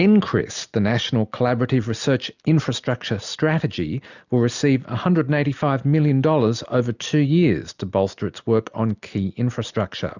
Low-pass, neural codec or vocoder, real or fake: 7.2 kHz; none; real